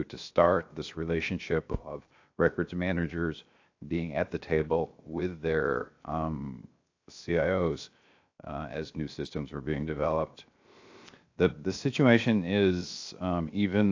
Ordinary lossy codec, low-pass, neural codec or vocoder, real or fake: AAC, 48 kbps; 7.2 kHz; codec, 16 kHz, 0.7 kbps, FocalCodec; fake